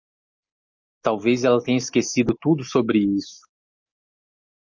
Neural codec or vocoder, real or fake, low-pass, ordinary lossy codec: none; real; 7.2 kHz; MP3, 48 kbps